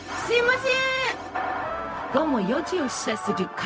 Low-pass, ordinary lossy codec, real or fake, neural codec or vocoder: none; none; fake; codec, 16 kHz, 0.4 kbps, LongCat-Audio-Codec